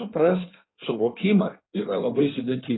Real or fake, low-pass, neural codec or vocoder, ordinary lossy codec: fake; 7.2 kHz; codec, 16 kHz in and 24 kHz out, 1.1 kbps, FireRedTTS-2 codec; AAC, 16 kbps